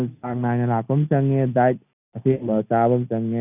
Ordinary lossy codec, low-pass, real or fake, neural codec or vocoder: none; 3.6 kHz; real; none